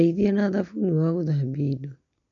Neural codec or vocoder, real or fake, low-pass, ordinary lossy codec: none; real; 7.2 kHz; MP3, 48 kbps